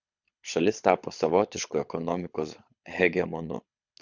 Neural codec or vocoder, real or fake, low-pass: codec, 24 kHz, 6 kbps, HILCodec; fake; 7.2 kHz